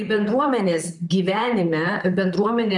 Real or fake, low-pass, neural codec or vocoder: fake; 10.8 kHz; vocoder, 44.1 kHz, 128 mel bands, Pupu-Vocoder